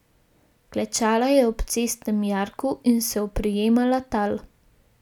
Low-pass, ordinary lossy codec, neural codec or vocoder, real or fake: 19.8 kHz; none; none; real